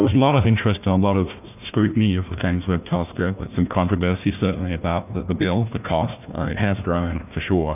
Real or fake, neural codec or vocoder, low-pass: fake; codec, 16 kHz, 1 kbps, FunCodec, trained on Chinese and English, 50 frames a second; 3.6 kHz